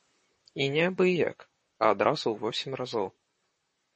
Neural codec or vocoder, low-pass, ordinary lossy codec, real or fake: vocoder, 44.1 kHz, 128 mel bands, Pupu-Vocoder; 10.8 kHz; MP3, 32 kbps; fake